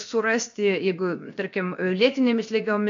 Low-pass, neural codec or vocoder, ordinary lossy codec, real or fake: 7.2 kHz; codec, 16 kHz, 0.7 kbps, FocalCodec; AAC, 64 kbps; fake